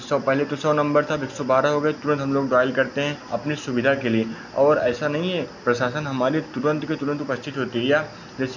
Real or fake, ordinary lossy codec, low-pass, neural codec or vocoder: real; none; 7.2 kHz; none